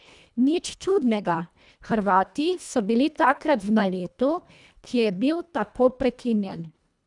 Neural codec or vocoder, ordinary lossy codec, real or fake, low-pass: codec, 24 kHz, 1.5 kbps, HILCodec; none; fake; 10.8 kHz